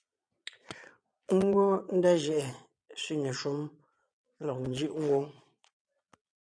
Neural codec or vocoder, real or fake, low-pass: vocoder, 22.05 kHz, 80 mel bands, Vocos; fake; 9.9 kHz